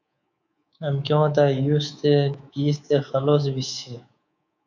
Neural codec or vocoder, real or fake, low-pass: codec, 24 kHz, 3.1 kbps, DualCodec; fake; 7.2 kHz